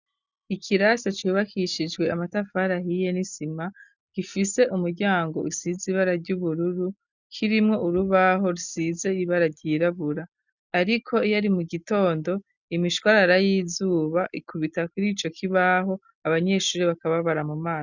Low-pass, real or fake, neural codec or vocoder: 7.2 kHz; real; none